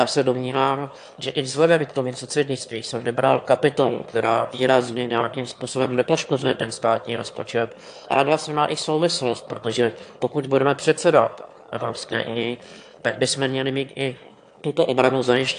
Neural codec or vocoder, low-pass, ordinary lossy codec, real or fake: autoencoder, 22.05 kHz, a latent of 192 numbers a frame, VITS, trained on one speaker; 9.9 kHz; AAC, 64 kbps; fake